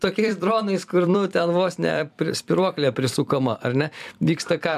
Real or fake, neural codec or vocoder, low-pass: fake; vocoder, 44.1 kHz, 128 mel bands every 256 samples, BigVGAN v2; 14.4 kHz